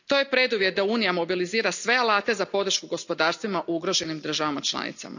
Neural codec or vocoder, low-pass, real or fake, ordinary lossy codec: none; 7.2 kHz; real; none